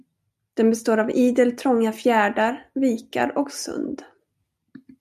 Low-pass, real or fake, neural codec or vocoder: 14.4 kHz; real; none